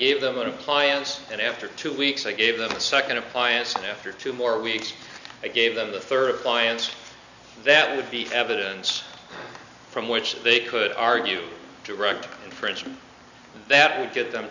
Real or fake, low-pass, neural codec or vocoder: real; 7.2 kHz; none